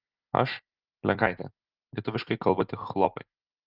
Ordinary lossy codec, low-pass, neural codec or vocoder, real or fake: Opus, 24 kbps; 5.4 kHz; none; real